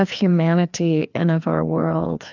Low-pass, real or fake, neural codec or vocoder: 7.2 kHz; fake; codec, 16 kHz, 2 kbps, FreqCodec, larger model